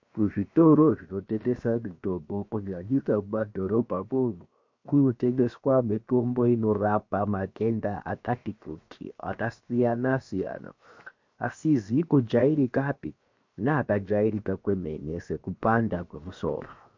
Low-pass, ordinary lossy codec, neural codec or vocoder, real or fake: 7.2 kHz; MP3, 48 kbps; codec, 16 kHz, 0.7 kbps, FocalCodec; fake